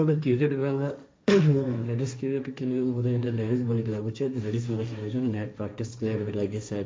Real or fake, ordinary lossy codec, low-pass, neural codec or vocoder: fake; none; none; codec, 16 kHz, 1.1 kbps, Voila-Tokenizer